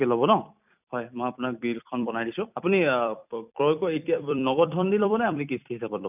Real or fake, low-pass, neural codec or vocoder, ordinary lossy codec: fake; 3.6 kHz; vocoder, 44.1 kHz, 128 mel bands every 256 samples, BigVGAN v2; none